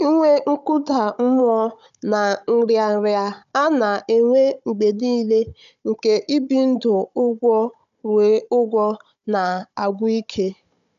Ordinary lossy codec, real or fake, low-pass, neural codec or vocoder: none; fake; 7.2 kHz; codec, 16 kHz, 16 kbps, FunCodec, trained on Chinese and English, 50 frames a second